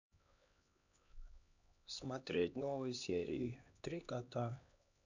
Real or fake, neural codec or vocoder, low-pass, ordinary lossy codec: fake; codec, 16 kHz, 2 kbps, X-Codec, HuBERT features, trained on LibriSpeech; 7.2 kHz; none